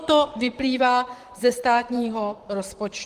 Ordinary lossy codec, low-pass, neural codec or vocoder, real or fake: Opus, 24 kbps; 14.4 kHz; vocoder, 44.1 kHz, 128 mel bands, Pupu-Vocoder; fake